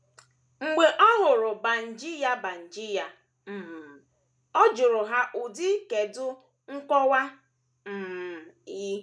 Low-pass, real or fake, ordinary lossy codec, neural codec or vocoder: none; real; none; none